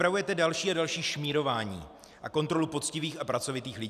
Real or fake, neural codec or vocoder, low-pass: real; none; 14.4 kHz